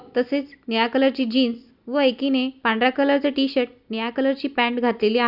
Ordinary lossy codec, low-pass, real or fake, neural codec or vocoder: Opus, 64 kbps; 5.4 kHz; real; none